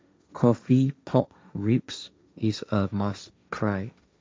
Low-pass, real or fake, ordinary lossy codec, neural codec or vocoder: none; fake; none; codec, 16 kHz, 1.1 kbps, Voila-Tokenizer